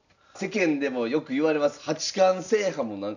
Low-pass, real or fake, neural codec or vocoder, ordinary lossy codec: 7.2 kHz; real; none; none